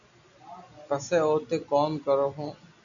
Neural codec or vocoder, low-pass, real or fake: none; 7.2 kHz; real